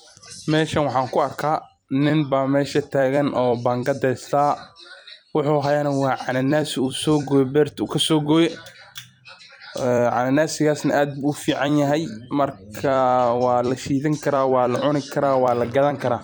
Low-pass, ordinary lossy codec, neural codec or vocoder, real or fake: none; none; vocoder, 44.1 kHz, 128 mel bands every 256 samples, BigVGAN v2; fake